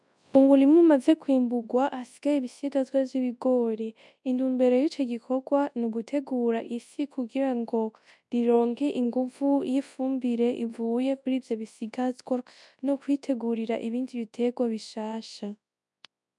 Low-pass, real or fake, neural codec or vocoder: 10.8 kHz; fake; codec, 24 kHz, 0.9 kbps, WavTokenizer, large speech release